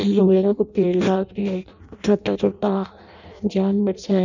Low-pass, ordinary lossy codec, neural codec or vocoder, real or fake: 7.2 kHz; none; codec, 16 kHz in and 24 kHz out, 0.6 kbps, FireRedTTS-2 codec; fake